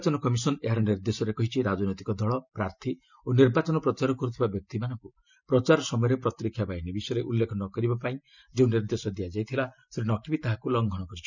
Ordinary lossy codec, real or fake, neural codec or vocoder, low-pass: none; real; none; 7.2 kHz